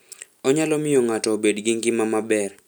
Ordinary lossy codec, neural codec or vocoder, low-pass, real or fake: none; none; none; real